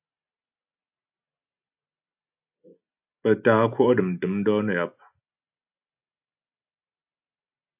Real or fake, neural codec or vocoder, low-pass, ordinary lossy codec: real; none; 3.6 kHz; AAC, 32 kbps